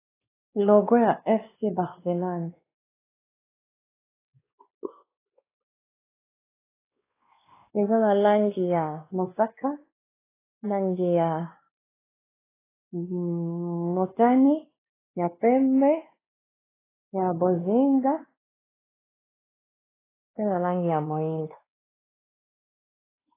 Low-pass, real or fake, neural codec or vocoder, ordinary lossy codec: 3.6 kHz; fake; codec, 16 kHz, 2 kbps, X-Codec, WavLM features, trained on Multilingual LibriSpeech; AAC, 16 kbps